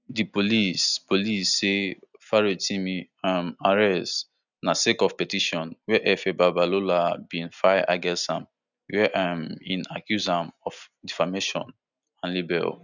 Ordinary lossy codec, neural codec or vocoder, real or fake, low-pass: none; none; real; 7.2 kHz